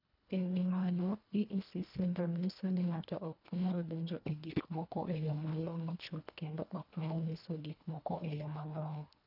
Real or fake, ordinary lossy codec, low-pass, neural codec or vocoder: fake; none; 5.4 kHz; codec, 24 kHz, 1.5 kbps, HILCodec